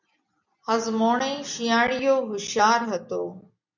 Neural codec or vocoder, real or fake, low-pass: none; real; 7.2 kHz